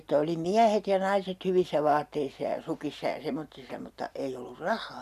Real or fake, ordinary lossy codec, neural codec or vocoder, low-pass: real; none; none; 14.4 kHz